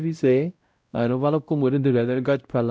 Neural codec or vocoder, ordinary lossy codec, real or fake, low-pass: codec, 16 kHz, 0.5 kbps, X-Codec, WavLM features, trained on Multilingual LibriSpeech; none; fake; none